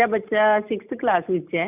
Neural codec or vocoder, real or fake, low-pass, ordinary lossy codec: none; real; 3.6 kHz; none